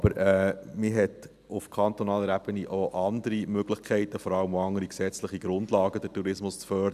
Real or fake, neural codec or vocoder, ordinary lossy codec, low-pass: real; none; none; 14.4 kHz